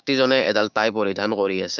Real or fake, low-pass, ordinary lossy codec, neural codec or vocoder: fake; 7.2 kHz; none; codec, 16 kHz, 6 kbps, DAC